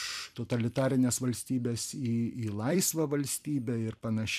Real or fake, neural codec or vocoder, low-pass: fake; vocoder, 44.1 kHz, 128 mel bands every 256 samples, BigVGAN v2; 14.4 kHz